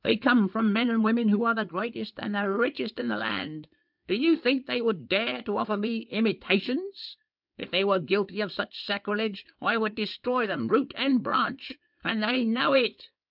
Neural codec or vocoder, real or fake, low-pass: codec, 16 kHz in and 24 kHz out, 2.2 kbps, FireRedTTS-2 codec; fake; 5.4 kHz